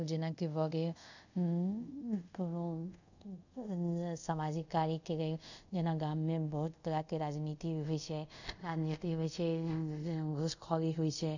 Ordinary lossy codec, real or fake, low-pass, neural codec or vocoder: none; fake; 7.2 kHz; codec, 24 kHz, 0.5 kbps, DualCodec